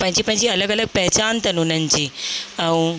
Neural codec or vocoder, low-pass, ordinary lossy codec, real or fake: none; none; none; real